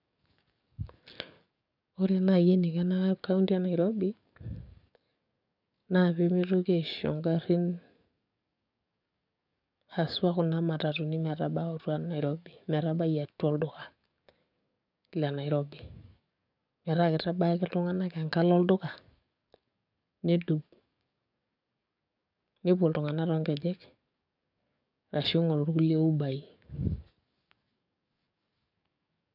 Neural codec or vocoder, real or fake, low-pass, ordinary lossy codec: codec, 44.1 kHz, 7.8 kbps, DAC; fake; 5.4 kHz; none